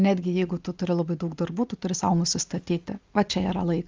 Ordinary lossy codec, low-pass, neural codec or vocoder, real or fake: Opus, 32 kbps; 7.2 kHz; none; real